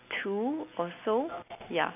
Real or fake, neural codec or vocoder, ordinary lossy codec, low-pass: real; none; none; 3.6 kHz